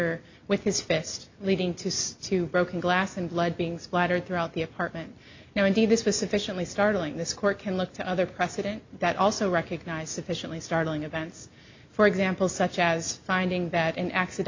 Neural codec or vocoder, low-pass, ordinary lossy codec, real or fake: none; 7.2 kHz; MP3, 64 kbps; real